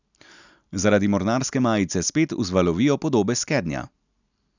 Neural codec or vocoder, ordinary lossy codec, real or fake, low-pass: none; none; real; 7.2 kHz